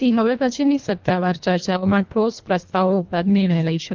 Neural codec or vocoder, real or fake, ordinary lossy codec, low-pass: codec, 24 kHz, 1.5 kbps, HILCodec; fake; Opus, 32 kbps; 7.2 kHz